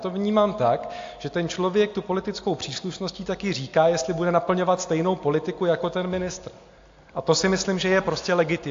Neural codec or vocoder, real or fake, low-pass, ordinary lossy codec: none; real; 7.2 kHz; AAC, 48 kbps